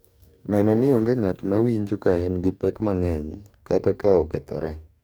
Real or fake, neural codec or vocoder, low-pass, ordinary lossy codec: fake; codec, 44.1 kHz, 2.6 kbps, DAC; none; none